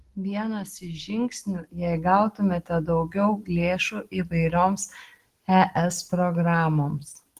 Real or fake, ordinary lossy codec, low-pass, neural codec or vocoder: real; Opus, 16 kbps; 14.4 kHz; none